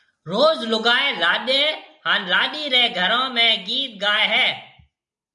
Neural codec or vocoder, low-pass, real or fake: none; 10.8 kHz; real